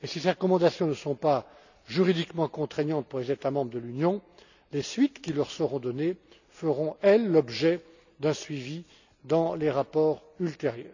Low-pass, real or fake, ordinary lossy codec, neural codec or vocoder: 7.2 kHz; real; none; none